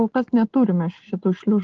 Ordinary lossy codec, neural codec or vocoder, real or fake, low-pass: Opus, 16 kbps; none; real; 7.2 kHz